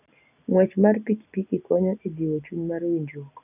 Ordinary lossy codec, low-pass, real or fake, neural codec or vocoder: none; 3.6 kHz; real; none